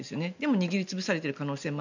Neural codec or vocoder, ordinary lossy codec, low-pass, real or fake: none; none; 7.2 kHz; real